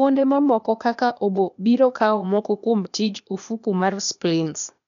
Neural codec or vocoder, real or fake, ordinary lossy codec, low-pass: codec, 16 kHz, 0.8 kbps, ZipCodec; fake; none; 7.2 kHz